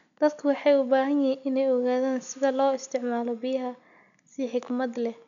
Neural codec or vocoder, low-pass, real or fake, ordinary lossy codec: none; 7.2 kHz; real; MP3, 96 kbps